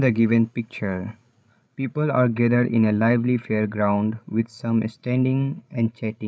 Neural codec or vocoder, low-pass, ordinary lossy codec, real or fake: codec, 16 kHz, 16 kbps, FreqCodec, larger model; none; none; fake